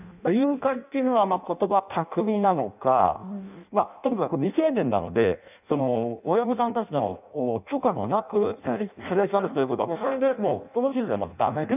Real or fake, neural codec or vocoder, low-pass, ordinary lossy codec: fake; codec, 16 kHz in and 24 kHz out, 0.6 kbps, FireRedTTS-2 codec; 3.6 kHz; none